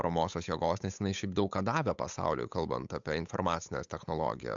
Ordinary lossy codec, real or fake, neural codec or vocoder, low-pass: MP3, 64 kbps; fake; codec, 16 kHz, 8 kbps, FunCodec, trained on Chinese and English, 25 frames a second; 7.2 kHz